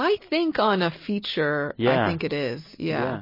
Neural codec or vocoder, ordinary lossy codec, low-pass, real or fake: none; MP3, 24 kbps; 5.4 kHz; real